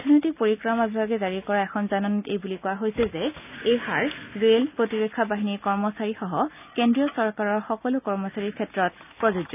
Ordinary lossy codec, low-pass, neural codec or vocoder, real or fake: none; 3.6 kHz; none; real